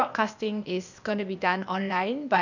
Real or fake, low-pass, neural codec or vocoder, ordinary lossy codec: fake; 7.2 kHz; codec, 16 kHz, 0.8 kbps, ZipCodec; none